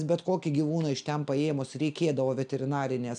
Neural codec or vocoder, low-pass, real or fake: none; 9.9 kHz; real